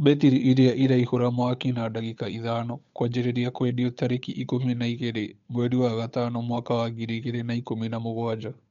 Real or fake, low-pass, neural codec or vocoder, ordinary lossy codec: fake; 7.2 kHz; codec, 16 kHz, 8 kbps, FunCodec, trained on Chinese and English, 25 frames a second; MP3, 64 kbps